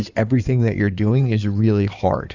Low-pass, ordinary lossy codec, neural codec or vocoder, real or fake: 7.2 kHz; Opus, 64 kbps; codec, 16 kHz in and 24 kHz out, 2.2 kbps, FireRedTTS-2 codec; fake